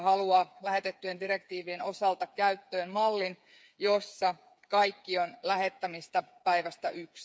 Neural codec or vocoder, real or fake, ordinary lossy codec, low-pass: codec, 16 kHz, 8 kbps, FreqCodec, smaller model; fake; none; none